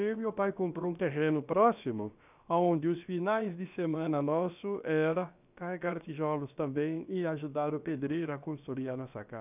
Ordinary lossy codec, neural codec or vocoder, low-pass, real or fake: none; codec, 16 kHz, about 1 kbps, DyCAST, with the encoder's durations; 3.6 kHz; fake